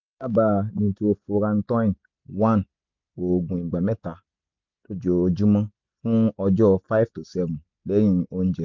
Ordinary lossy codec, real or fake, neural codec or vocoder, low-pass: MP3, 64 kbps; real; none; 7.2 kHz